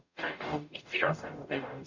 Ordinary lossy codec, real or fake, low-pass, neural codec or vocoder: none; fake; 7.2 kHz; codec, 44.1 kHz, 0.9 kbps, DAC